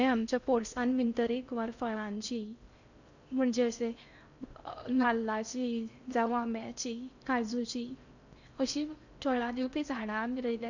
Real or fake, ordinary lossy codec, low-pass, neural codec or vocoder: fake; none; 7.2 kHz; codec, 16 kHz in and 24 kHz out, 0.6 kbps, FocalCodec, streaming, 4096 codes